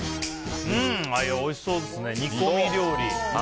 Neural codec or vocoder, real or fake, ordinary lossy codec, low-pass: none; real; none; none